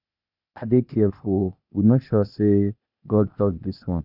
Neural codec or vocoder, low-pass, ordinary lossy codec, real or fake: codec, 16 kHz, 0.8 kbps, ZipCodec; 5.4 kHz; none; fake